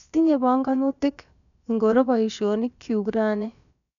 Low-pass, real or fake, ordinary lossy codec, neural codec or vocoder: 7.2 kHz; fake; none; codec, 16 kHz, about 1 kbps, DyCAST, with the encoder's durations